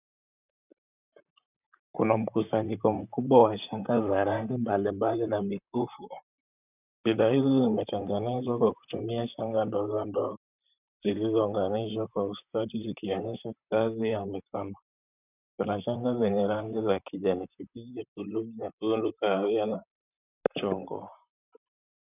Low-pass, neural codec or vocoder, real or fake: 3.6 kHz; vocoder, 44.1 kHz, 128 mel bands, Pupu-Vocoder; fake